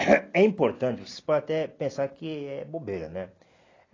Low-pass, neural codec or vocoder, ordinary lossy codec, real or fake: 7.2 kHz; none; AAC, 32 kbps; real